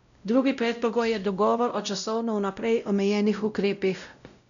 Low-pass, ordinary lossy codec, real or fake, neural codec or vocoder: 7.2 kHz; none; fake; codec, 16 kHz, 0.5 kbps, X-Codec, WavLM features, trained on Multilingual LibriSpeech